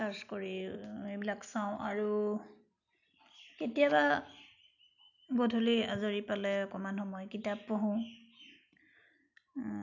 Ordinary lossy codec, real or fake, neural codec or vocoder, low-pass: none; real; none; 7.2 kHz